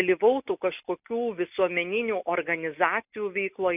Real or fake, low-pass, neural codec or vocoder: real; 3.6 kHz; none